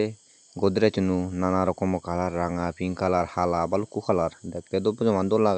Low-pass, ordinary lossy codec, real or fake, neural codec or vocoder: none; none; real; none